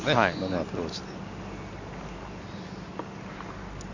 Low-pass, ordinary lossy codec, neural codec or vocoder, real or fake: 7.2 kHz; none; none; real